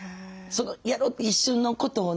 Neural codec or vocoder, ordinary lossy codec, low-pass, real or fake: none; none; none; real